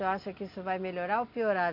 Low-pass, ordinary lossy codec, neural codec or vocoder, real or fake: 5.4 kHz; MP3, 48 kbps; none; real